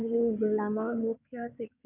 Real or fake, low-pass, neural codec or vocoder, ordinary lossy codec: fake; 3.6 kHz; codec, 16 kHz in and 24 kHz out, 2.2 kbps, FireRedTTS-2 codec; none